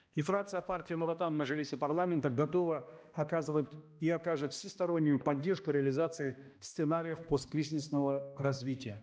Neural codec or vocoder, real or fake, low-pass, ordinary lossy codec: codec, 16 kHz, 1 kbps, X-Codec, HuBERT features, trained on balanced general audio; fake; none; none